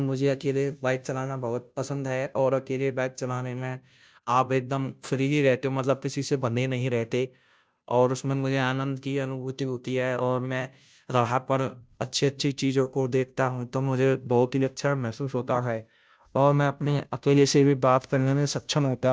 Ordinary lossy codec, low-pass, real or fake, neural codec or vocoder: none; none; fake; codec, 16 kHz, 0.5 kbps, FunCodec, trained on Chinese and English, 25 frames a second